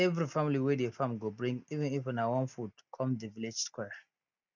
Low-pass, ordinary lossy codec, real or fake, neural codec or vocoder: 7.2 kHz; none; real; none